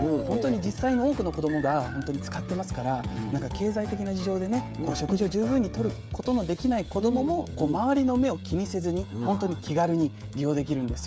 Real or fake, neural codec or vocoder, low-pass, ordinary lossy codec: fake; codec, 16 kHz, 16 kbps, FreqCodec, smaller model; none; none